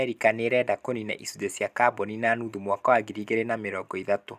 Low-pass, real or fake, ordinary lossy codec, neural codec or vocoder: 14.4 kHz; real; none; none